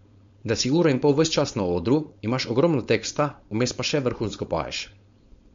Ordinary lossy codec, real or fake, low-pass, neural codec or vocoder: MP3, 48 kbps; fake; 7.2 kHz; codec, 16 kHz, 4.8 kbps, FACodec